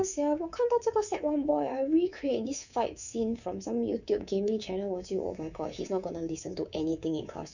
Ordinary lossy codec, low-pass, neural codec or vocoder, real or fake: none; 7.2 kHz; codec, 24 kHz, 3.1 kbps, DualCodec; fake